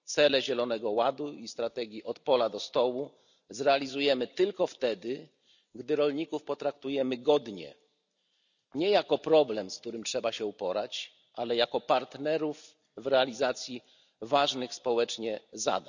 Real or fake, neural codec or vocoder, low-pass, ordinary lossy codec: real; none; 7.2 kHz; none